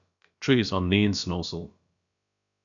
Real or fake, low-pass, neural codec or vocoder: fake; 7.2 kHz; codec, 16 kHz, about 1 kbps, DyCAST, with the encoder's durations